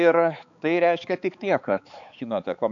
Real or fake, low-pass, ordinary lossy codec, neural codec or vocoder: fake; 7.2 kHz; AAC, 64 kbps; codec, 16 kHz, 4 kbps, X-Codec, HuBERT features, trained on balanced general audio